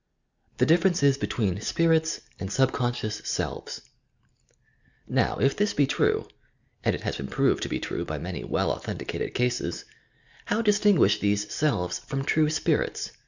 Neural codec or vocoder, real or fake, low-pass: none; real; 7.2 kHz